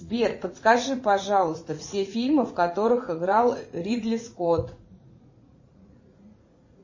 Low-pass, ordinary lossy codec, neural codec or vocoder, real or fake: 7.2 kHz; MP3, 32 kbps; vocoder, 44.1 kHz, 80 mel bands, Vocos; fake